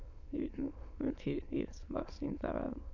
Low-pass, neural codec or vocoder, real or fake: 7.2 kHz; autoencoder, 22.05 kHz, a latent of 192 numbers a frame, VITS, trained on many speakers; fake